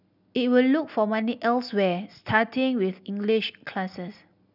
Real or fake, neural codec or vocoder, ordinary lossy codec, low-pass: real; none; none; 5.4 kHz